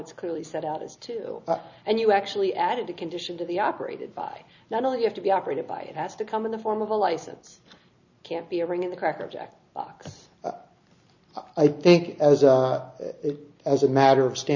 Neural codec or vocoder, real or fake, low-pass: none; real; 7.2 kHz